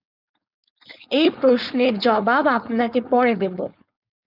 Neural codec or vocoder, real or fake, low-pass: codec, 16 kHz, 4.8 kbps, FACodec; fake; 5.4 kHz